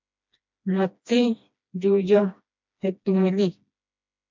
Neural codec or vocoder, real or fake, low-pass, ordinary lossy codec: codec, 16 kHz, 1 kbps, FreqCodec, smaller model; fake; 7.2 kHz; MP3, 64 kbps